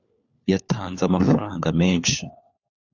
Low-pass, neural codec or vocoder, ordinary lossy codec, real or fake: 7.2 kHz; codec, 16 kHz, 4 kbps, FunCodec, trained on LibriTTS, 50 frames a second; AAC, 48 kbps; fake